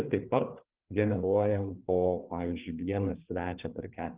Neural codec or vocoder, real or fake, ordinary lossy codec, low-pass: codec, 16 kHz, 4 kbps, FreqCodec, larger model; fake; Opus, 32 kbps; 3.6 kHz